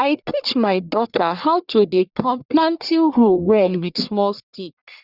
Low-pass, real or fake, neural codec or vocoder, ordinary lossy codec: 5.4 kHz; fake; codec, 44.1 kHz, 1.7 kbps, Pupu-Codec; Opus, 64 kbps